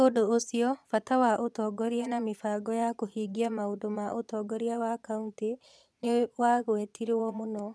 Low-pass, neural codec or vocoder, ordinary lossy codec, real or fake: none; vocoder, 22.05 kHz, 80 mel bands, Vocos; none; fake